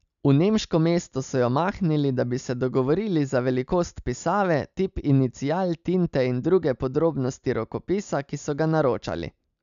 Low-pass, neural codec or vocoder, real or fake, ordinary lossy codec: 7.2 kHz; none; real; MP3, 96 kbps